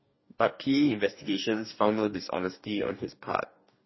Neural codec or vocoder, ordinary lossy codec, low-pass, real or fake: codec, 44.1 kHz, 2.6 kbps, DAC; MP3, 24 kbps; 7.2 kHz; fake